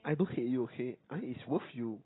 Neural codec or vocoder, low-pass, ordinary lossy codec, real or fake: none; 7.2 kHz; AAC, 16 kbps; real